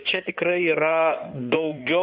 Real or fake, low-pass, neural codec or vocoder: fake; 5.4 kHz; codec, 16 kHz in and 24 kHz out, 2.2 kbps, FireRedTTS-2 codec